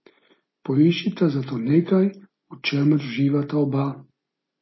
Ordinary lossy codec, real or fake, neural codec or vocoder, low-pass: MP3, 24 kbps; real; none; 7.2 kHz